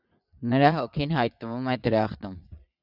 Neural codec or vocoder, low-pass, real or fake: vocoder, 22.05 kHz, 80 mel bands, Vocos; 5.4 kHz; fake